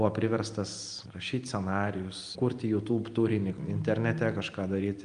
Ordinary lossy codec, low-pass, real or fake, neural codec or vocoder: Opus, 32 kbps; 9.9 kHz; real; none